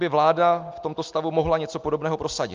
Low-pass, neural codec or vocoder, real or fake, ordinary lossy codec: 7.2 kHz; none; real; Opus, 24 kbps